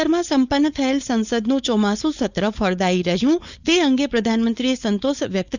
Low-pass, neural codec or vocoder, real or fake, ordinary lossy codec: 7.2 kHz; codec, 16 kHz, 4.8 kbps, FACodec; fake; none